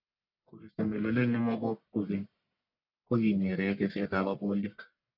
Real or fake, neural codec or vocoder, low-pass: fake; codec, 44.1 kHz, 1.7 kbps, Pupu-Codec; 5.4 kHz